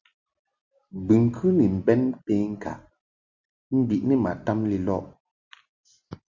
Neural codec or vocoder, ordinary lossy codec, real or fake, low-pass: none; Opus, 64 kbps; real; 7.2 kHz